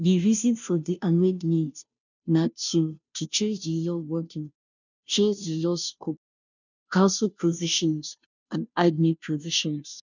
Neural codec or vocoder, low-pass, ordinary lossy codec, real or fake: codec, 16 kHz, 0.5 kbps, FunCodec, trained on Chinese and English, 25 frames a second; 7.2 kHz; none; fake